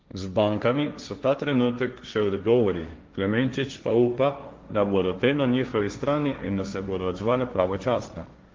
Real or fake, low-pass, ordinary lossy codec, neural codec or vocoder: fake; 7.2 kHz; Opus, 32 kbps; codec, 16 kHz, 1.1 kbps, Voila-Tokenizer